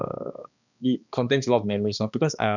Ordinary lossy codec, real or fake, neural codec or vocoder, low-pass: none; fake; codec, 16 kHz, 2 kbps, X-Codec, HuBERT features, trained on balanced general audio; 7.2 kHz